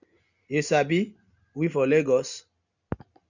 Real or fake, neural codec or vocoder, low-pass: real; none; 7.2 kHz